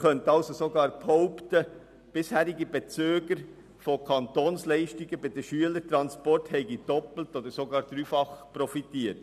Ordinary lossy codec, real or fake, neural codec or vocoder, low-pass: none; real; none; 14.4 kHz